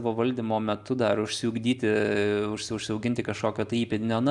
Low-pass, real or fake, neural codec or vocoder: 10.8 kHz; real; none